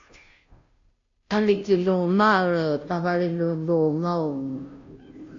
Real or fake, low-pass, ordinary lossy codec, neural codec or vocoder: fake; 7.2 kHz; AAC, 48 kbps; codec, 16 kHz, 0.5 kbps, FunCodec, trained on Chinese and English, 25 frames a second